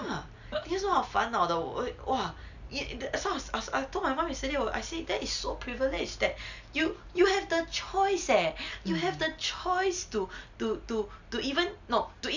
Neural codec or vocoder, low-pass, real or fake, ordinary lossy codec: none; 7.2 kHz; real; none